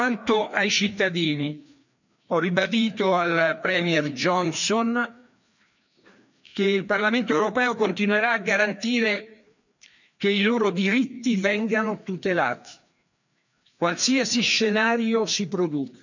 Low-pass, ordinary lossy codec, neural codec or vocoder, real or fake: 7.2 kHz; none; codec, 16 kHz, 2 kbps, FreqCodec, larger model; fake